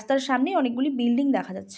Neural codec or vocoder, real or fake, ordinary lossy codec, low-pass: none; real; none; none